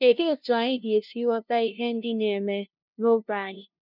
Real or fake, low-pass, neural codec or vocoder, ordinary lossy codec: fake; 5.4 kHz; codec, 16 kHz, 0.5 kbps, FunCodec, trained on LibriTTS, 25 frames a second; none